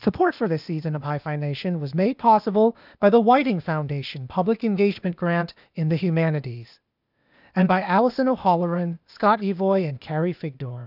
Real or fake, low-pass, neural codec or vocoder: fake; 5.4 kHz; codec, 16 kHz, 0.8 kbps, ZipCodec